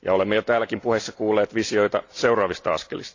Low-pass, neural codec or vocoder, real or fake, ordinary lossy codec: 7.2 kHz; none; real; AAC, 48 kbps